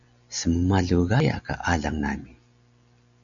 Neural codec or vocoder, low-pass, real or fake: none; 7.2 kHz; real